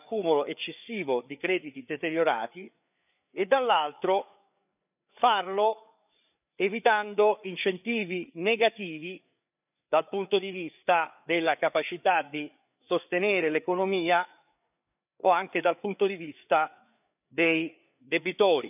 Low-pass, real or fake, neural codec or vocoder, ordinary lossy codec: 3.6 kHz; fake; codec, 16 kHz, 4 kbps, FreqCodec, larger model; none